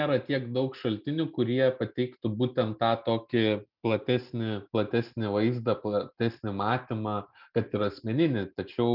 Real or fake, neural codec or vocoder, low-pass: real; none; 5.4 kHz